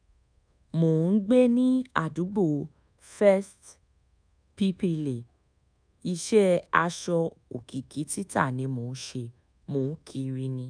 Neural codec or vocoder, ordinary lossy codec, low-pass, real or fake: codec, 24 kHz, 1.2 kbps, DualCodec; none; 9.9 kHz; fake